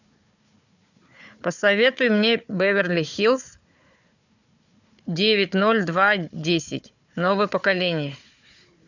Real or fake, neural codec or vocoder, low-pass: fake; codec, 16 kHz, 4 kbps, FunCodec, trained on Chinese and English, 50 frames a second; 7.2 kHz